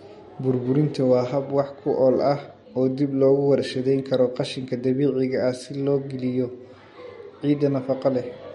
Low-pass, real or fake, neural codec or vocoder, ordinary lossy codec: 19.8 kHz; real; none; MP3, 48 kbps